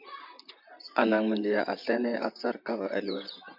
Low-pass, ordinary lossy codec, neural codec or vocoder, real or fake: 5.4 kHz; MP3, 48 kbps; vocoder, 44.1 kHz, 128 mel bands, Pupu-Vocoder; fake